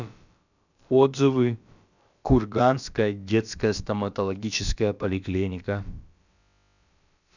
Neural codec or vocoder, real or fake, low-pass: codec, 16 kHz, about 1 kbps, DyCAST, with the encoder's durations; fake; 7.2 kHz